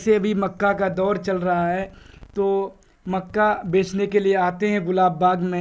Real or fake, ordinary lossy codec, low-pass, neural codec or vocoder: real; none; none; none